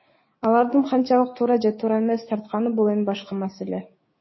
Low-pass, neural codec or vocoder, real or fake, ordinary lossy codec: 7.2 kHz; codec, 16 kHz, 6 kbps, DAC; fake; MP3, 24 kbps